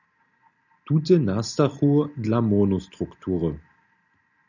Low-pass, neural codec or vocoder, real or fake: 7.2 kHz; none; real